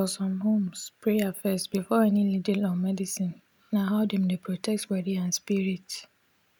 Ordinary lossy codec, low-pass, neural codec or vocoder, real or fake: none; 19.8 kHz; none; real